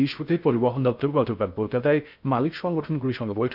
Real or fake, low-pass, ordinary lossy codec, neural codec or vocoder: fake; 5.4 kHz; none; codec, 16 kHz in and 24 kHz out, 0.6 kbps, FocalCodec, streaming, 4096 codes